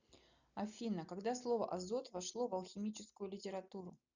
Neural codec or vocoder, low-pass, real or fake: none; 7.2 kHz; real